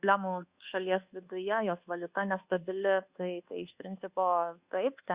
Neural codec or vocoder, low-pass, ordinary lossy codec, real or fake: codec, 24 kHz, 1.2 kbps, DualCodec; 3.6 kHz; Opus, 64 kbps; fake